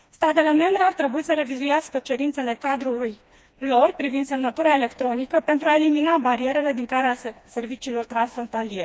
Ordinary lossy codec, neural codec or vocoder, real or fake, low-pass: none; codec, 16 kHz, 2 kbps, FreqCodec, smaller model; fake; none